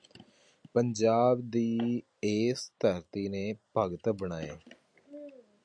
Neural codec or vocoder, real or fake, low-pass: none; real; 9.9 kHz